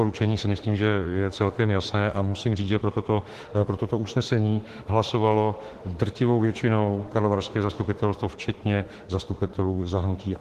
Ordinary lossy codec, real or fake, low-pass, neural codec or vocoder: Opus, 16 kbps; fake; 14.4 kHz; autoencoder, 48 kHz, 32 numbers a frame, DAC-VAE, trained on Japanese speech